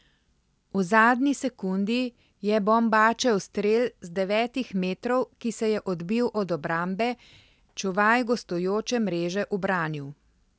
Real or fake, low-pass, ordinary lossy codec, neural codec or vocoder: real; none; none; none